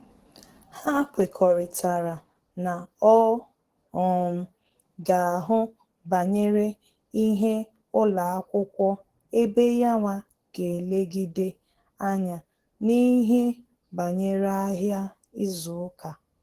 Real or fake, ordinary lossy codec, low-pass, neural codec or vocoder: fake; Opus, 16 kbps; 14.4 kHz; codec, 44.1 kHz, 7.8 kbps, DAC